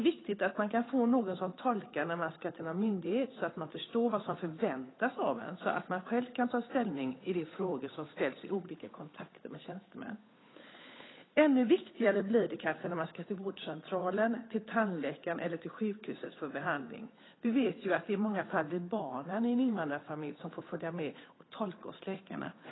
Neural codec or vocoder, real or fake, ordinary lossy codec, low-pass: codec, 16 kHz, 8 kbps, FunCodec, trained on Chinese and English, 25 frames a second; fake; AAC, 16 kbps; 7.2 kHz